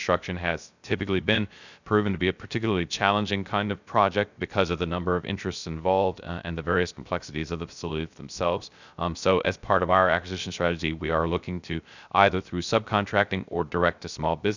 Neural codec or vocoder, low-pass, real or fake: codec, 16 kHz, 0.3 kbps, FocalCodec; 7.2 kHz; fake